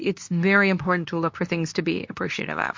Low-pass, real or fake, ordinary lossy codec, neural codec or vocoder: 7.2 kHz; fake; MP3, 48 kbps; codec, 24 kHz, 0.9 kbps, WavTokenizer, medium speech release version 2